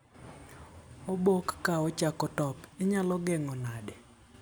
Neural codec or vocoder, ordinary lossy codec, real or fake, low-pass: none; none; real; none